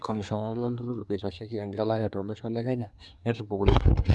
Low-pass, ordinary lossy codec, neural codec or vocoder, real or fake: none; none; codec, 24 kHz, 1 kbps, SNAC; fake